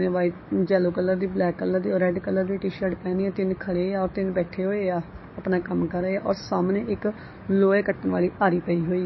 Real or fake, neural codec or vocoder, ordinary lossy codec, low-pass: fake; codec, 44.1 kHz, 7.8 kbps, DAC; MP3, 24 kbps; 7.2 kHz